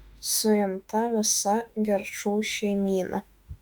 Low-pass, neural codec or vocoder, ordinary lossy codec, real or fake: 19.8 kHz; autoencoder, 48 kHz, 32 numbers a frame, DAC-VAE, trained on Japanese speech; Opus, 64 kbps; fake